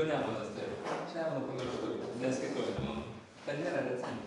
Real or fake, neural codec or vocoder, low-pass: fake; vocoder, 24 kHz, 100 mel bands, Vocos; 10.8 kHz